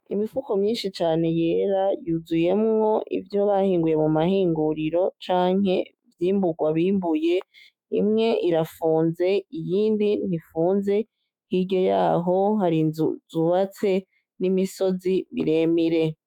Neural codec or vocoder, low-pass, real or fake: autoencoder, 48 kHz, 128 numbers a frame, DAC-VAE, trained on Japanese speech; 19.8 kHz; fake